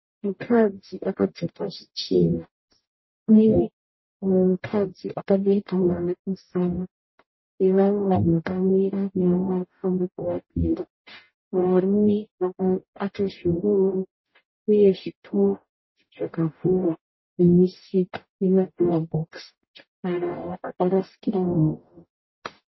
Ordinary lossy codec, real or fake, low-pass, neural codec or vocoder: MP3, 24 kbps; fake; 7.2 kHz; codec, 44.1 kHz, 0.9 kbps, DAC